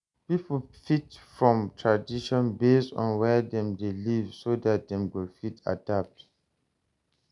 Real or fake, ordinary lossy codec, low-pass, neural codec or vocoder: real; none; 10.8 kHz; none